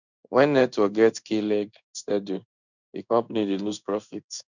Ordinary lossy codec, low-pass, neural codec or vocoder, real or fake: none; 7.2 kHz; codec, 16 kHz in and 24 kHz out, 1 kbps, XY-Tokenizer; fake